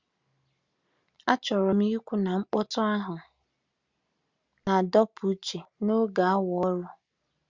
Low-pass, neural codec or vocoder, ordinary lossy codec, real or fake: 7.2 kHz; none; Opus, 64 kbps; real